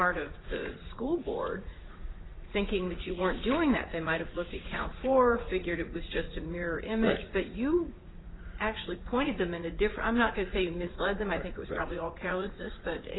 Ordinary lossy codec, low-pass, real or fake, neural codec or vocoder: AAC, 16 kbps; 7.2 kHz; fake; vocoder, 44.1 kHz, 80 mel bands, Vocos